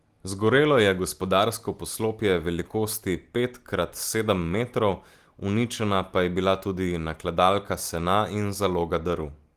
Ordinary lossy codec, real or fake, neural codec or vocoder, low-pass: Opus, 24 kbps; real; none; 14.4 kHz